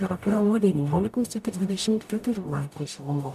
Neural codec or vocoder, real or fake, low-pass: codec, 44.1 kHz, 0.9 kbps, DAC; fake; 14.4 kHz